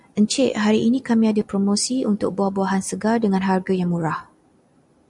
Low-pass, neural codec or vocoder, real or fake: 10.8 kHz; none; real